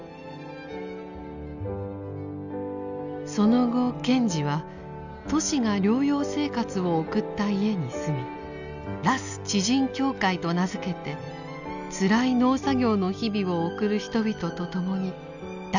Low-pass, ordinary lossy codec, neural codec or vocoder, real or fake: 7.2 kHz; none; none; real